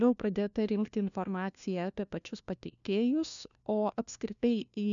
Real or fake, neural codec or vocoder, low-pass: fake; codec, 16 kHz, 1 kbps, FunCodec, trained on LibriTTS, 50 frames a second; 7.2 kHz